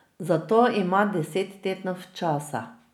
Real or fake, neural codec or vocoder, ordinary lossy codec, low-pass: real; none; none; 19.8 kHz